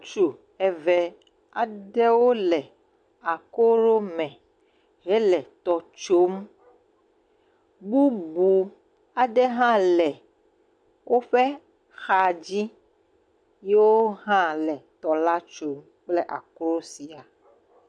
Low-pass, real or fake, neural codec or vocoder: 9.9 kHz; real; none